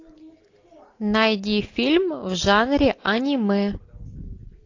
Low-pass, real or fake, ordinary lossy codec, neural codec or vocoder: 7.2 kHz; real; AAC, 48 kbps; none